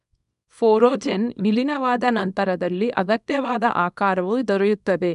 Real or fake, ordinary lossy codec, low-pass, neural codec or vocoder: fake; none; 10.8 kHz; codec, 24 kHz, 0.9 kbps, WavTokenizer, small release